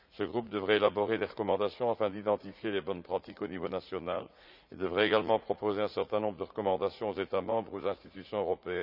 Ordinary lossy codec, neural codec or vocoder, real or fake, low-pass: none; vocoder, 44.1 kHz, 80 mel bands, Vocos; fake; 5.4 kHz